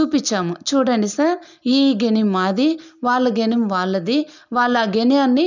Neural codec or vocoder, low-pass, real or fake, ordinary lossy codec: none; 7.2 kHz; real; none